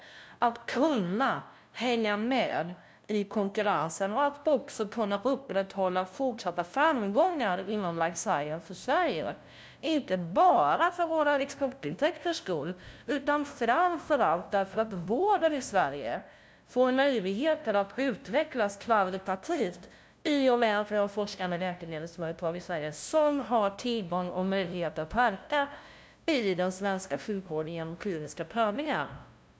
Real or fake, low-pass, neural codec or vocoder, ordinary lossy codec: fake; none; codec, 16 kHz, 0.5 kbps, FunCodec, trained on LibriTTS, 25 frames a second; none